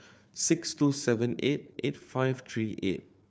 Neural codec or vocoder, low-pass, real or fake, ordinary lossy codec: codec, 16 kHz, 4 kbps, FunCodec, trained on Chinese and English, 50 frames a second; none; fake; none